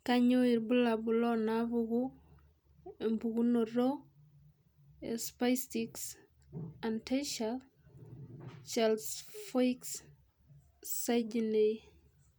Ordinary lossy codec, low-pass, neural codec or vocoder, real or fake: none; none; none; real